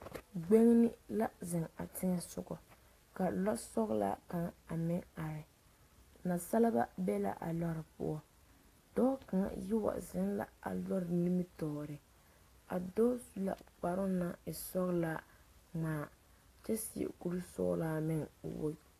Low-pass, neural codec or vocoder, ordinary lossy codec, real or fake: 14.4 kHz; none; AAC, 48 kbps; real